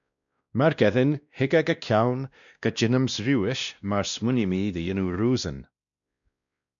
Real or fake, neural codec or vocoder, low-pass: fake; codec, 16 kHz, 1 kbps, X-Codec, WavLM features, trained on Multilingual LibriSpeech; 7.2 kHz